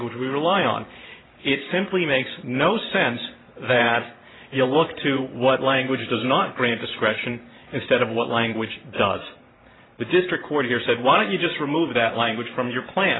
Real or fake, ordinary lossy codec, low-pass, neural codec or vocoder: fake; AAC, 16 kbps; 7.2 kHz; vocoder, 44.1 kHz, 128 mel bands every 512 samples, BigVGAN v2